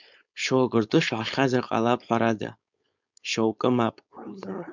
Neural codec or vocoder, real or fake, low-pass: codec, 16 kHz, 4.8 kbps, FACodec; fake; 7.2 kHz